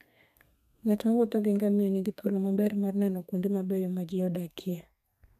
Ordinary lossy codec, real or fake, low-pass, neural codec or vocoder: none; fake; 14.4 kHz; codec, 32 kHz, 1.9 kbps, SNAC